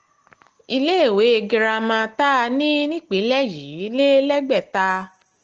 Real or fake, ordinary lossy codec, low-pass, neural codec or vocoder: real; Opus, 16 kbps; 7.2 kHz; none